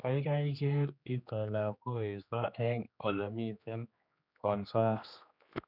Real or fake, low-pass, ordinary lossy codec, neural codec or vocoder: fake; 5.4 kHz; none; codec, 16 kHz, 2 kbps, X-Codec, HuBERT features, trained on general audio